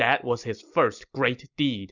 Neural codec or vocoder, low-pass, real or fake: none; 7.2 kHz; real